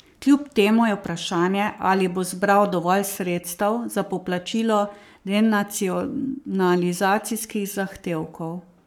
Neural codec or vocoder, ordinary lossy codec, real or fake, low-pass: codec, 44.1 kHz, 7.8 kbps, Pupu-Codec; none; fake; 19.8 kHz